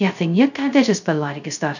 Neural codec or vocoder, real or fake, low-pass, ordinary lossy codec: codec, 16 kHz, 0.2 kbps, FocalCodec; fake; 7.2 kHz; none